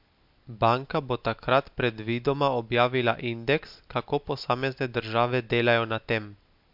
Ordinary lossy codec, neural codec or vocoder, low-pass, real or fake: MP3, 48 kbps; none; 5.4 kHz; real